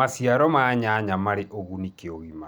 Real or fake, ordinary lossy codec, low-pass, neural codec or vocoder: real; none; none; none